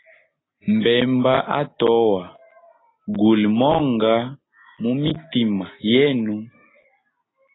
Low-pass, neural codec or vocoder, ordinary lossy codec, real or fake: 7.2 kHz; none; AAC, 16 kbps; real